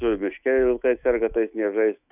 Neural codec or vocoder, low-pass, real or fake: autoencoder, 48 kHz, 128 numbers a frame, DAC-VAE, trained on Japanese speech; 3.6 kHz; fake